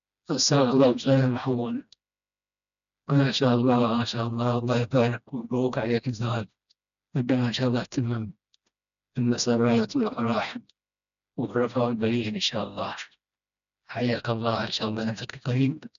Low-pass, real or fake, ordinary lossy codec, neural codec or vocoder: 7.2 kHz; fake; none; codec, 16 kHz, 1 kbps, FreqCodec, smaller model